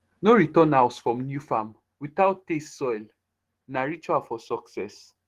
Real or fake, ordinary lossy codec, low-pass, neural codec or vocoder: fake; Opus, 16 kbps; 14.4 kHz; autoencoder, 48 kHz, 128 numbers a frame, DAC-VAE, trained on Japanese speech